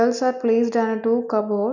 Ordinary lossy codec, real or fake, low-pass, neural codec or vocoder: none; real; 7.2 kHz; none